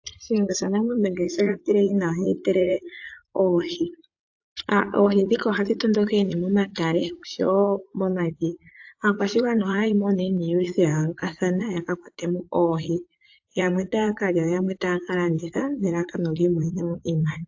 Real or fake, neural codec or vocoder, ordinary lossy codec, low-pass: fake; vocoder, 44.1 kHz, 80 mel bands, Vocos; AAC, 48 kbps; 7.2 kHz